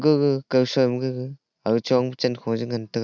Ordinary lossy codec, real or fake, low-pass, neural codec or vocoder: none; real; 7.2 kHz; none